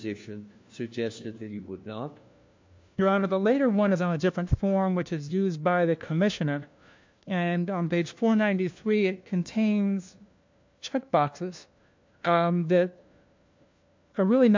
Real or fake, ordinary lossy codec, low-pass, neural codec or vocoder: fake; MP3, 48 kbps; 7.2 kHz; codec, 16 kHz, 1 kbps, FunCodec, trained on LibriTTS, 50 frames a second